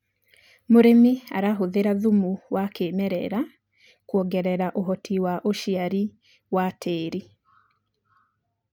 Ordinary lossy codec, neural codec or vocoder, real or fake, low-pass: none; none; real; 19.8 kHz